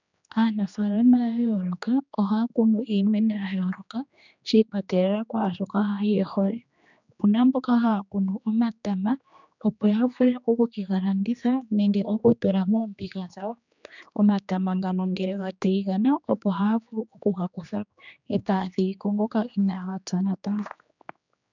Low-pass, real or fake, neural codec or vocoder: 7.2 kHz; fake; codec, 16 kHz, 2 kbps, X-Codec, HuBERT features, trained on general audio